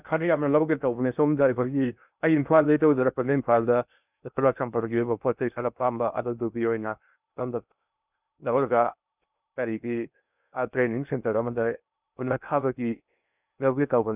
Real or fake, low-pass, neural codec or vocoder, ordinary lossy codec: fake; 3.6 kHz; codec, 16 kHz in and 24 kHz out, 0.6 kbps, FocalCodec, streaming, 2048 codes; none